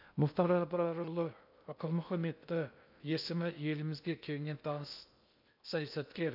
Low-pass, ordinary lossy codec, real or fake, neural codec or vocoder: 5.4 kHz; none; fake; codec, 16 kHz in and 24 kHz out, 0.6 kbps, FocalCodec, streaming, 2048 codes